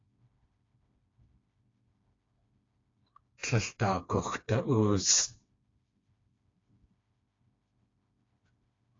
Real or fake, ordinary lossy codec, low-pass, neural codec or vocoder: fake; AAC, 32 kbps; 7.2 kHz; codec, 16 kHz, 2 kbps, FreqCodec, smaller model